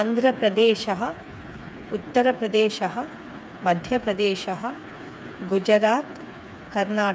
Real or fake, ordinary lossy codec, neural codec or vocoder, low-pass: fake; none; codec, 16 kHz, 4 kbps, FreqCodec, smaller model; none